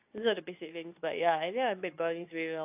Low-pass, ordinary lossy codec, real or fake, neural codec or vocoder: 3.6 kHz; AAC, 32 kbps; fake; codec, 24 kHz, 0.9 kbps, WavTokenizer, medium speech release version 2